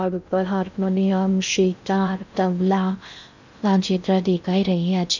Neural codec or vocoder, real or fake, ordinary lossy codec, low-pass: codec, 16 kHz in and 24 kHz out, 0.6 kbps, FocalCodec, streaming, 2048 codes; fake; none; 7.2 kHz